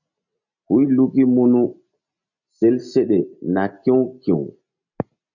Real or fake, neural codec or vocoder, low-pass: real; none; 7.2 kHz